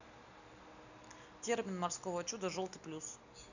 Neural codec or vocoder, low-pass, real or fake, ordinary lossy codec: none; 7.2 kHz; real; none